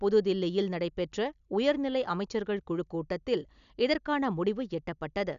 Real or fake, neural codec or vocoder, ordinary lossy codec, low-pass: real; none; none; 7.2 kHz